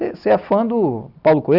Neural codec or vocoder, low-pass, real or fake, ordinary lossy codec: none; 5.4 kHz; real; none